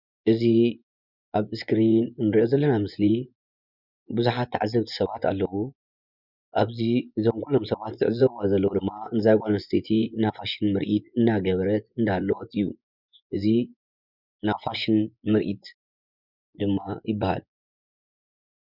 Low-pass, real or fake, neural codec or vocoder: 5.4 kHz; fake; vocoder, 24 kHz, 100 mel bands, Vocos